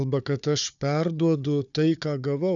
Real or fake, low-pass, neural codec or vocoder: fake; 7.2 kHz; codec, 16 kHz, 4 kbps, FunCodec, trained on Chinese and English, 50 frames a second